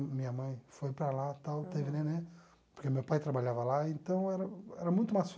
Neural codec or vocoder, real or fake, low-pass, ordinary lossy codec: none; real; none; none